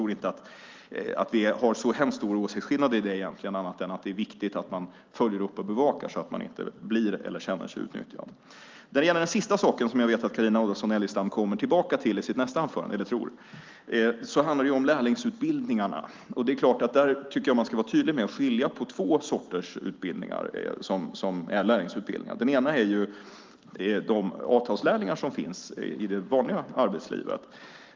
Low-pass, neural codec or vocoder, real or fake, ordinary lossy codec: 7.2 kHz; none; real; Opus, 24 kbps